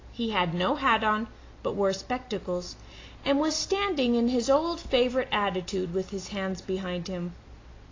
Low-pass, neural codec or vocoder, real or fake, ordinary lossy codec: 7.2 kHz; none; real; AAC, 32 kbps